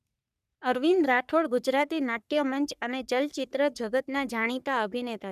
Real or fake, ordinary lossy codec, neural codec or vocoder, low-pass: fake; none; codec, 44.1 kHz, 3.4 kbps, Pupu-Codec; 14.4 kHz